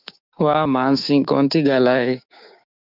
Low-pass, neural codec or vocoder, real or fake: 5.4 kHz; codec, 16 kHz, 6 kbps, DAC; fake